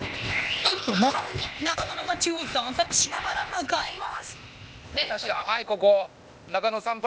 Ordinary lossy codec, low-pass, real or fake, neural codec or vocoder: none; none; fake; codec, 16 kHz, 0.8 kbps, ZipCodec